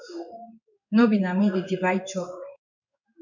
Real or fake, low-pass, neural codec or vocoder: fake; 7.2 kHz; codec, 16 kHz in and 24 kHz out, 1 kbps, XY-Tokenizer